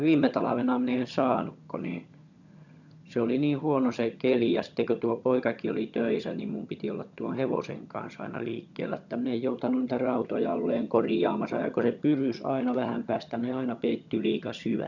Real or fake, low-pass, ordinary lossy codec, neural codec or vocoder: fake; 7.2 kHz; none; vocoder, 22.05 kHz, 80 mel bands, HiFi-GAN